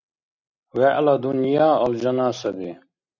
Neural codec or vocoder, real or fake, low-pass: none; real; 7.2 kHz